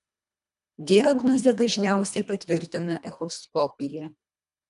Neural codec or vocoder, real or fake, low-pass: codec, 24 kHz, 1.5 kbps, HILCodec; fake; 10.8 kHz